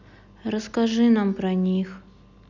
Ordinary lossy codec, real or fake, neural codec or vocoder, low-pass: none; real; none; 7.2 kHz